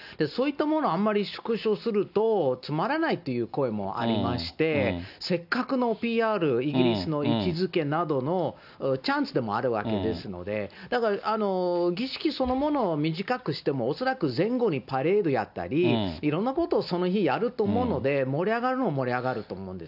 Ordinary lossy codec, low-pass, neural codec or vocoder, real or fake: none; 5.4 kHz; none; real